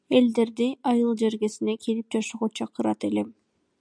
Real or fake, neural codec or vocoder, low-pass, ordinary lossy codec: real; none; 9.9 kHz; MP3, 96 kbps